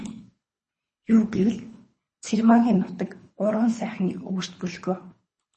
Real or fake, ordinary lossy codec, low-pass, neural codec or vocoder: fake; MP3, 32 kbps; 10.8 kHz; codec, 24 kHz, 3 kbps, HILCodec